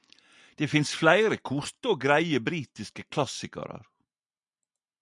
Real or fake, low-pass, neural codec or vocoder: real; 10.8 kHz; none